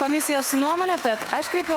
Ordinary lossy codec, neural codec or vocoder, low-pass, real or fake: Opus, 64 kbps; autoencoder, 48 kHz, 32 numbers a frame, DAC-VAE, trained on Japanese speech; 19.8 kHz; fake